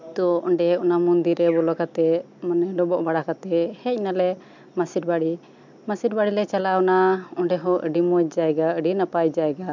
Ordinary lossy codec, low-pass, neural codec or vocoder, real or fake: MP3, 64 kbps; 7.2 kHz; none; real